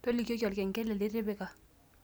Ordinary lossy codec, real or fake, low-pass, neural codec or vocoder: none; real; none; none